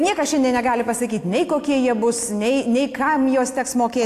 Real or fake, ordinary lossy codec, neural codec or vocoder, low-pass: real; AAC, 64 kbps; none; 14.4 kHz